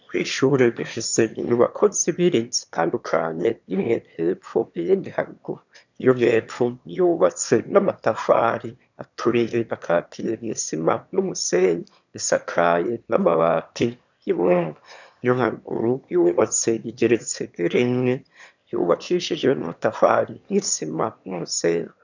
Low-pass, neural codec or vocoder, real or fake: 7.2 kHz; autoencoder, 22.05 kHz, a latent of 192 numbers a frame, VITS, trained on one speaker; fake